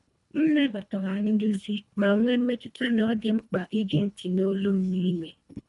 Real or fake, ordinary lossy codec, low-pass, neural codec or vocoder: fake; none; 10.8 kHz; codec, 24 kHz, 1.5 kbps, HILCodec